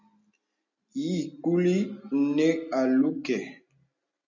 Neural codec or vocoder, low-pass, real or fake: none; 7.2 kHz; real